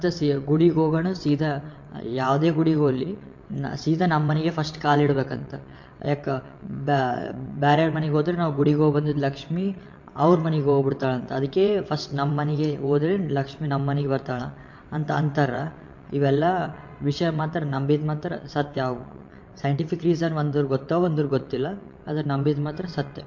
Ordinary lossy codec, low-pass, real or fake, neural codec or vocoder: MP3, 48 kbps; 7.2 kHz; fake; vocoder, 22.05 kHz, 80 mel bands, WaveNeXt